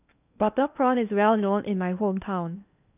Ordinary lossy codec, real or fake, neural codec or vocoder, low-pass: none; fake; codec, 16 kHz in and 24 kHz out, 0.8 kbps, FocalCodec, streaming, 65536 codes; 3.6 kHz